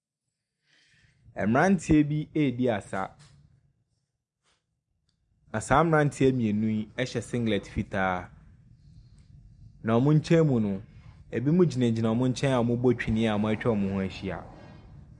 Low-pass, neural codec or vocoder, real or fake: 10.8 kHz; none; real